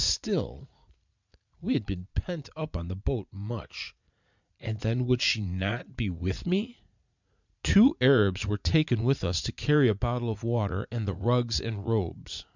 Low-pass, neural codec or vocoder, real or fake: 7.2 kHz; vocoder, 44.1 kHz, 80 mel bands, Vocos; fake